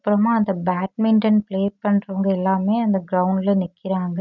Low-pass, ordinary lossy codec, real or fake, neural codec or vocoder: 7.2 kHz; none; real; none